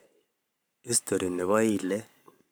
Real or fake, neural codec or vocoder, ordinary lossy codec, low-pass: fake; codec, 44.1 kHz, 7.8 kbps, Pupu-Codec; none; none